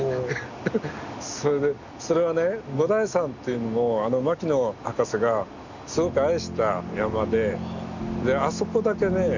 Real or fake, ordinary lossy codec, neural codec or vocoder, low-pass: real; none; none; 7.2 kHz